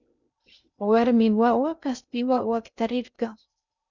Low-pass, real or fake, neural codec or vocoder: 7.2 kHz; fake; codec, 16 kHz in and 24 kHz out, 0.6 kbps, FocalCodec, streaming, 2048 codes